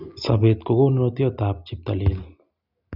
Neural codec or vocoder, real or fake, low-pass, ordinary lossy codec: none; real; 5.4 kHz; none